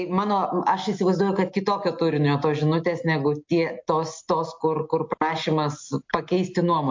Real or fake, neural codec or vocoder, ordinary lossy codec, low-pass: real; none; MP3, 48 kbps; 7.2 kHz